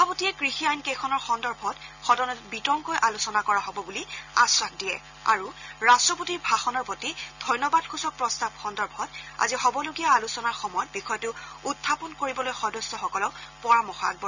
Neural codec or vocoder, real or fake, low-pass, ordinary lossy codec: none; real; 7.2 kHz; none